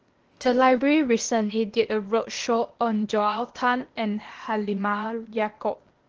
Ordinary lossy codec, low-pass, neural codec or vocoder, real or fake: Opus, 16 kbps; 7.2 kHz; codec, 16 kHz, 0.8 kbps, ZipCodec; fake